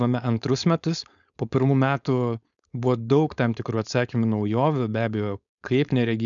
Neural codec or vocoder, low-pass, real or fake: codec, 16 kHz, 4.8 kbps, FACodec; 7.2 kHz; fake